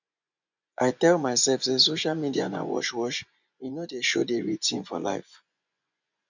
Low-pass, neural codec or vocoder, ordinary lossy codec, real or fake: 7.2 kHz; vocoder, 24 kHz, 100 mel bands, Vocos; none; fake